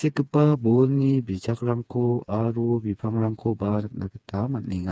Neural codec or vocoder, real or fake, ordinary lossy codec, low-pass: codec, 16 kHz, 4 kbps, FreqCodec, smaller model; fake; none; none